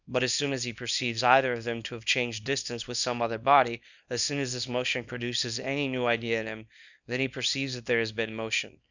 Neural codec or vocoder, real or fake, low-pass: codec, 24 kHz, 0.9 kbps, WavTokenizer, small release; fake; 7.2 kHz